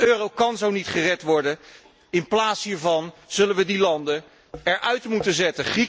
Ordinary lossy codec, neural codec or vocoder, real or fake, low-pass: none; none; real; none